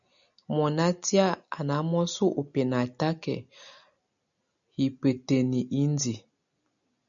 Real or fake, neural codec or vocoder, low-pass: real; none; 7.2 kHz